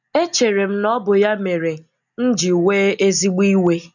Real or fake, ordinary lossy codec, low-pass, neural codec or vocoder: real; none; 7.2 kHz; none